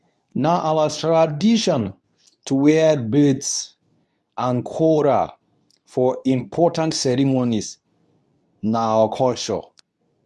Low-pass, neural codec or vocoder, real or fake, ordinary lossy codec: none; codec, 24 kHz, 0.9 kbps, WavTokenizer, medium speech release version 2; fake; none